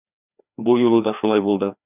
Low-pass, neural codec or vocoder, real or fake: 3.6 kHz; codec, 16 kHz, 2 kbps, FreqCodec, larger model; fake